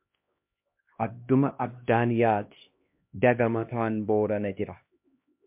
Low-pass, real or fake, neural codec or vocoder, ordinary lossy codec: 3.6 kHz; fake; codec, 16 kHz, 1 kbps, X-Codec, HuBERT features, trained on LibriSpeech; MP3, 32 kbps